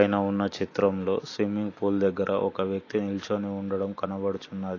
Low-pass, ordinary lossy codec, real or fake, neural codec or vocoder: 7.2 kHz; AAC, 32 kbps; real; none